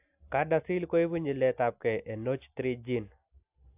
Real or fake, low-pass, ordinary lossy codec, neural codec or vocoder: real; 3.6 kHz; AAC, 32 kbps; none